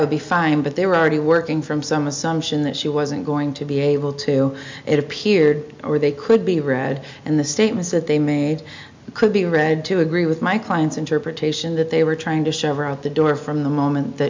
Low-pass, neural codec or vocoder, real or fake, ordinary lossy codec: 7.2 kHz; none; real; MP3, 64 kbps